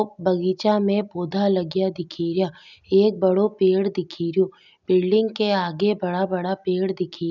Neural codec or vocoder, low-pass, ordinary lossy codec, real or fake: none; 7.2 kHz; none; real